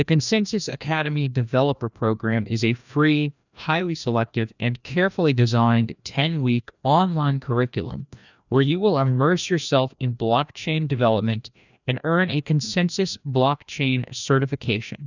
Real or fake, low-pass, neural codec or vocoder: fake; 7.2 kHz; codec, 16 kHz, 1 kbps, FreqCodec, larger model